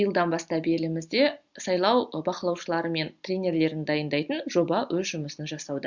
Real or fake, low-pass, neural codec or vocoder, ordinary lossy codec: real; 7.2 kHz; none; Opus, 64 kbps